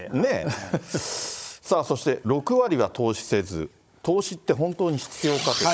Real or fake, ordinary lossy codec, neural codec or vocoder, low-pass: fake; none; codec, 16 kHz, 16 kbps, FunCodec, trained on Chinese and English, 50 frames a second; none